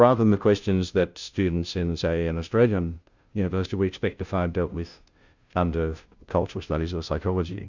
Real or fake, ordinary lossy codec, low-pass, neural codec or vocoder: fake; Opus, 64 kbps; 7.2 kHz; codec, 16 kHz, 0.5 kbps, FunCodec, trained on Chinese and English, 25 frames a second